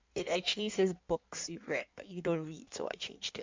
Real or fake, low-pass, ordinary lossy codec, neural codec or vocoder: fake; 7.2 kHz; MP3, 64 kbps; codec, 16 kHz in and 24 kHz out, 1.1 kbps, FireRedTTS-2 codec